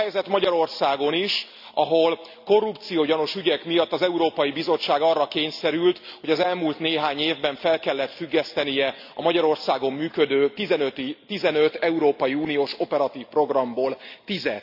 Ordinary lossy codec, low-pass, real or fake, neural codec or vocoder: AAC, 48 kbps; 5.4 kHz; real; none